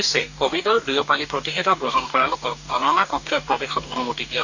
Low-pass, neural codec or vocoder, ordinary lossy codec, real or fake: 7.2 kHz; codec, 44.1 kHz, 2.6 kbps, DAC; none; fake